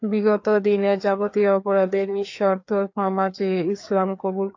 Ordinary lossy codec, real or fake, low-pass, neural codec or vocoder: AAC, 48 kbps; fake; 7.2 kHz; codec, 16 kHz, 2 kbps, FreqCodec, larger model